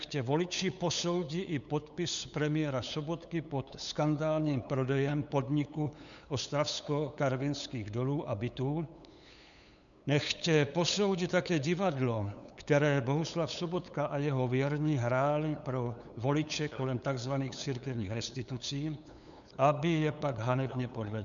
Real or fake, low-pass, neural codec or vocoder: fake; 7.2 kHz; codec, 16 kHz, 8 kbps, FunCodec, trained on LibriTTS, 25 frames a second